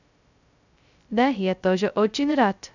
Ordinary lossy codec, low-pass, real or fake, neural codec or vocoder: none; 7.2 kHz; fake; codec, 16 kHz, 0.2 kbps, FocalCodec